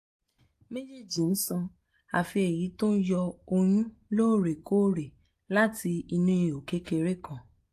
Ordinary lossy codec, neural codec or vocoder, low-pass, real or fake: AAC, 64 kbps; none; 14.4 kHz; real